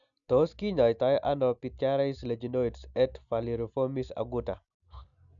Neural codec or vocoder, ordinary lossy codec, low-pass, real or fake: none; none; 7.2 kHz; real